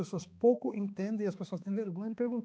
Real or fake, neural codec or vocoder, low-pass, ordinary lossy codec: fake; codec, 16 kHz, 2 kbps, X-Codec, HuBERT features, trained on balanced general audio; none; none